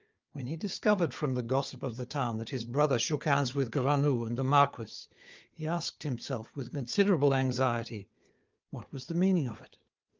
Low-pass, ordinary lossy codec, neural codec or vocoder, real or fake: 7.2 kHz; Opus, 24 kbps; codec, 16 kHz, 4 kbps, FunCodec, trained on Chinese and English, 50 frames a second; fake